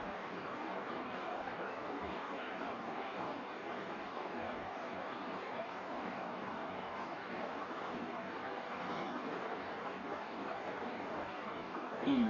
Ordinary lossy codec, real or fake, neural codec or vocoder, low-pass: none; fake; codec, 44.1 kHz, 2.6 kbps, DAC; 7.2 kHz